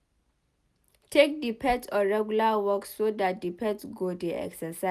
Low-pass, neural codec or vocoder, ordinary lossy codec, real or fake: 14.4 kHz; none; none; real